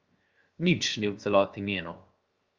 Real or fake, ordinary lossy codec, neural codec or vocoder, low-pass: fake; Opus, 32 kbps; codec, 16 kHz, 0.7 kbps, FocalCodec; 7.2 kHz